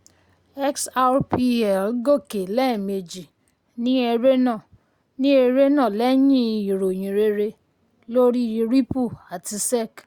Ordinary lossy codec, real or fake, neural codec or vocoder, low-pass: Opus, 64 kbps; real; none; 19.8 kHz